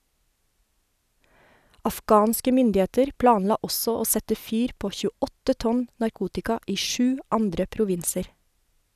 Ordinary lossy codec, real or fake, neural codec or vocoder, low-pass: none; real; none; 14.4 kHz